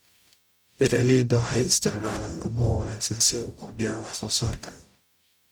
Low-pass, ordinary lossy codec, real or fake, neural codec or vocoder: none; none; fake; codec, 44.1 kHz, 0.9 kbps, DAC